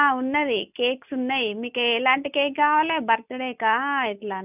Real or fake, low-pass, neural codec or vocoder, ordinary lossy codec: real; 3.6 kHz; none; none